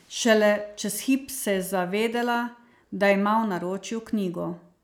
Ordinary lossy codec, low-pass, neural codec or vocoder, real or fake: none; none; none; real